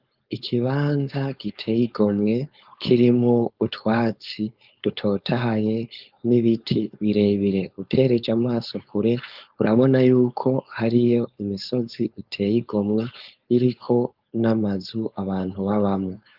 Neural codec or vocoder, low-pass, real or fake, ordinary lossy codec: codec, 16 kHz, 4.8 kbps, FACodec; 5.4 kHz; fake; Opus, 24 kbps